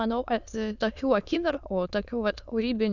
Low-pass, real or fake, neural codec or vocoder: 7.2 kHz; fake; autoencoder, 22.05 kHz, a latent of 192 numbers a frame, VITS, trained on many speakers